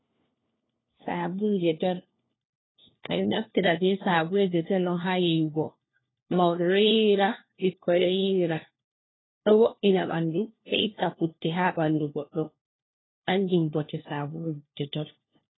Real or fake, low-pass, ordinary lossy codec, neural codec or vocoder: fake; 7.2 kHz; AAC, 16 kbps; codec, 16 kHz, 1 kbps, FunCodec, trained on LibriTTS, 50 frames a second